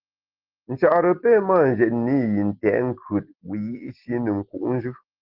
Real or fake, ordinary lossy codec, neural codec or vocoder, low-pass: real; Opus, 16 kbps; none; 5.4 kHz